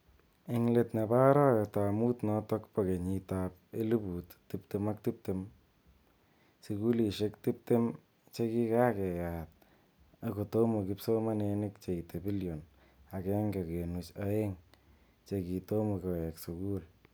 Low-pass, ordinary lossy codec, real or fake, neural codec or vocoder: none; none; real; none